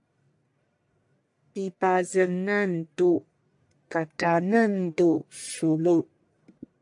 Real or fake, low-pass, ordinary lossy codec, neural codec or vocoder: fake; 10.8 kHz; AAC, 64 kbps; codec, 44.1 kHz, 1.7 kbps, Pupu-Codec